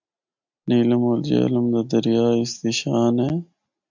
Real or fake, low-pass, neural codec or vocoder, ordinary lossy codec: real; 7.2 kHz; none; MP3, 64 kbps